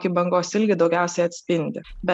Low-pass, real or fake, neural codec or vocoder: 10.8 kHz; real; none